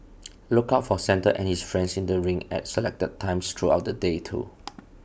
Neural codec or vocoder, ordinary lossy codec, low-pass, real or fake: none; none; none; real